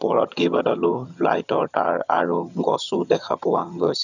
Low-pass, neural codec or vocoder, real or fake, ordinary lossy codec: 7.2 kHz; vocoder, 22.05 kHz, 80 mel bands, HiFi-GAN; fake; none